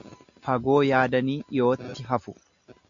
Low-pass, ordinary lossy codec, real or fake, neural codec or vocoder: 7.2 kHz; MP3, 64 kbps; real; none